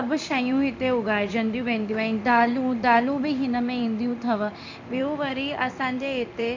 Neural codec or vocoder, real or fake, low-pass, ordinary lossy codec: codec, 16 kHz in and 24 kHz out, 1 kbps, XY-Tokenizer; fake; 7.2 kHz; MP3, 64 kbps